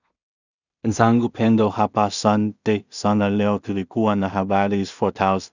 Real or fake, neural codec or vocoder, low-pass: fake; codec, 16 kHz in and 24 kHz out, 0.4 kbps, LongCat-Audio-Codec, two codebook decoder; 7.2 kHz